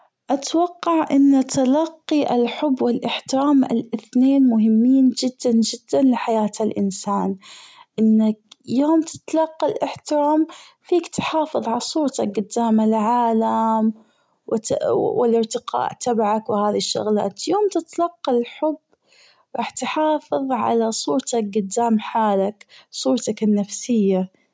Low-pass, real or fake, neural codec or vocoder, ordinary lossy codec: none; real; none; none